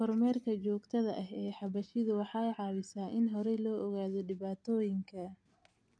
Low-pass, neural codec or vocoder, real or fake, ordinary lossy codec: 9.9 kHz; none; real; none